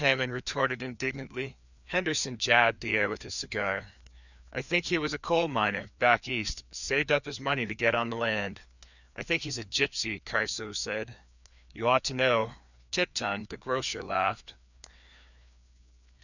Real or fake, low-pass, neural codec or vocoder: fake; 7.2 kHz; codec, 16 kHz, 2 kbps, FreqCodec, larger model